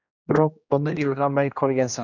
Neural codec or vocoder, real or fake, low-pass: codec, 16 kHz, 1 kbps, X-Codec, HuBERT features, trained on general audio; fake; 7.2 kHz